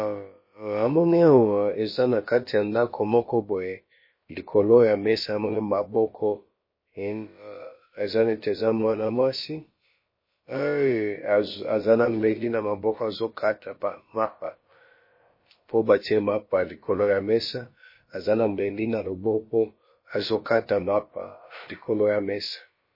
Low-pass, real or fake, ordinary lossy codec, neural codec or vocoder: 5.4 kHz; fake; MP3, 24 kbps; codec, 16 kHz, about 1 kbps, DyCAST, with the encoder's durations